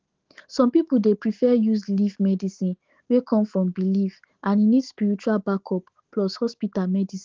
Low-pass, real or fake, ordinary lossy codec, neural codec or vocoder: 7.2 kHz; fake; Opus, 16 kbps; autoencoder, 48 kHz, 128 numbers a frame, DAC-VAE, trained on Japanese speech